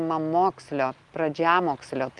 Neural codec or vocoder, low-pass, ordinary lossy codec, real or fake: none; 10.8 kHz; Opus, 24 kbps; real